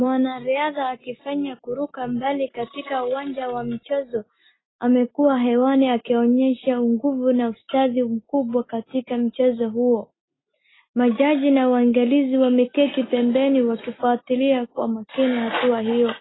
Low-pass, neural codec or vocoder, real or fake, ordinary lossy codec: 7.2 kHz; none; real; AAC, 16 kbps